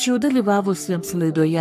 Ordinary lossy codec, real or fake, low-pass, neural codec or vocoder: MP3, 64 kbps; fake; 14.4 kHz; codec, 44.1 kHz, 3.4 kbps, Pupu-Codec